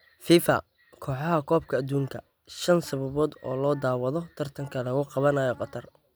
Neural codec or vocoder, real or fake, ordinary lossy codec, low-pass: none; real; none; none